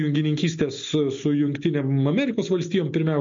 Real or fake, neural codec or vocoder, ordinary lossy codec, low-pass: real; none; MP3, 48 kbps; 7.2 kHz